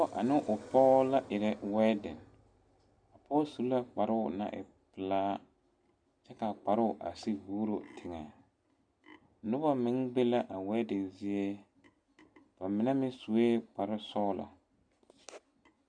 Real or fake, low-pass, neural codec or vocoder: real; 9.9 kHz; none